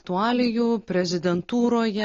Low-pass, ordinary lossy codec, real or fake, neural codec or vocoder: 7.2 kHz; AAC, 32 kbps; real; none